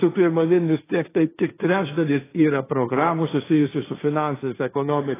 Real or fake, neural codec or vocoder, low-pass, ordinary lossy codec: fake; codec, 16 kHz, 1.1 kbps, Voila-Tokenizer; 3.6 kHz; AAC, 16 kbps